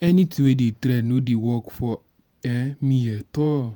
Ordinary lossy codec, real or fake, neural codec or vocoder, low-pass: none; fake; vocoder, 48 kHz, 128 mel bands, Vocos; none